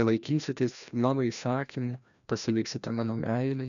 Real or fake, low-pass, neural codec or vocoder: fake; 7.2 kHz; codec, 16 kHz, 1 kbps, FreqCodec, larger model